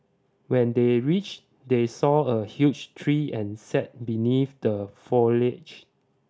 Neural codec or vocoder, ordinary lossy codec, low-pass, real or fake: none; none; none; real